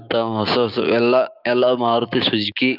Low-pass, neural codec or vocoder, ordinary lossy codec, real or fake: 5.4 kHz; codec, 16 kHz, 6 kbps, DAC; none; fake